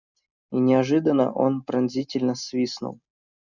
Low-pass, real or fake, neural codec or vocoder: 7.2 kHz; real; none